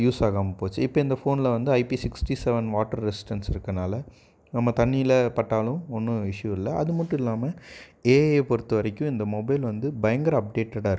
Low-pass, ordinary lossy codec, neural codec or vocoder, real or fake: none; none; none; real